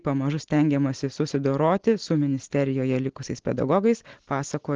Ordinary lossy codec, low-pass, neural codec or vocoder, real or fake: Opus, 16 kbps; 7.2 kHz; none; real